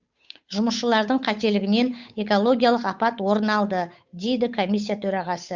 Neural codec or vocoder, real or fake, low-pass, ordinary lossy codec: codec, 16 kHz, 8 kbps, FunCodec, trained on Chinese and English, 25 frames a second; fake; 7.2 kHz; Opus, 64 kbps